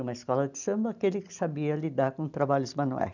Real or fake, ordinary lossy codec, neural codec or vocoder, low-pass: real; none; none; 7.2 kHz